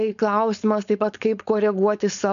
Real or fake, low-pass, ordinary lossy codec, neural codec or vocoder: fake; 7.2 kHz; MP3, 96 kbps; codec, 16 kHz, 4.8 kbps, FACodec